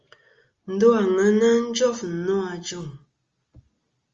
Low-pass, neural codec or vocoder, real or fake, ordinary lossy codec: 7.2 kHz; none; real; Opus, 32 kbps